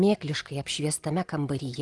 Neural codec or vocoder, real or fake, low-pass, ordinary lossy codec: none; real; 10.8 kHz; Opus, 24 kbps